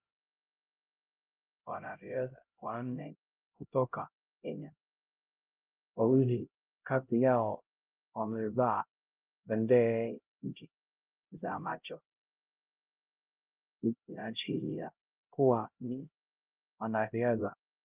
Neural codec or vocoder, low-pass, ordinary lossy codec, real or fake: codec, 16 kHz, 0.5 kbps, X-Codec, HuBERT features, trained on LibriSpeech; 3.6 kHz; Opus, 24 kbps; fake